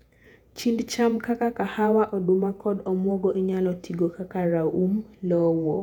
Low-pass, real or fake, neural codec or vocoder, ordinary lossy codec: 19.8 kHz; fake; vocoder, 48 kHz, 128 mel bands, Vocos; none